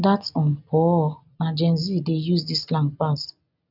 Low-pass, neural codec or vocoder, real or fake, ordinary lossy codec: 5.4 kHz; none; real; none